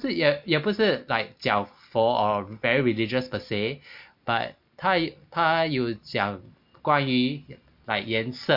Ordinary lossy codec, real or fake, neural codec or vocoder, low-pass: MP3, 48 kbps; real; none; 5.4 kHz